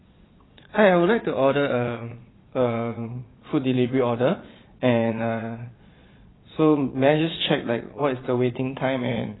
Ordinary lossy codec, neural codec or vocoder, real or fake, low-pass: AAC, 16 kbps; vocoder, 22.05 kHz, 80 mel bands, Vocos; fake; 7.2 kHz